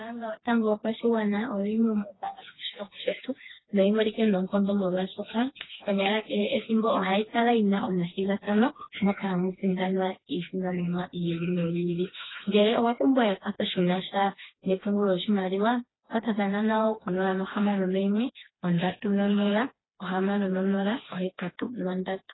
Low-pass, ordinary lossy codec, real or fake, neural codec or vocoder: 7.2 kHz; AAC, 16 kbps; fake; codec, 16 kHz, 2 kbps, FreqCodec, smaller model